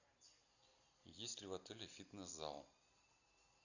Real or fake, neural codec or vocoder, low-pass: real; none; 7.2 kHz